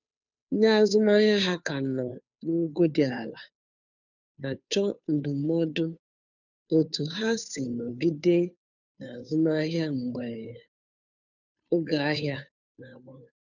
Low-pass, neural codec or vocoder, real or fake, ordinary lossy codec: 7.2 kHz; codec, 16 kHz, 2 kbps, FunCodec, trained on Chinese and English, 25 frames a second; fake; none